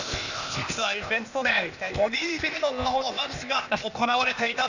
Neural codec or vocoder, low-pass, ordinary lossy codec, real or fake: codec, 16 kHz, 0.8 kbps, ZipCodec; 7.2 kHz; none; fake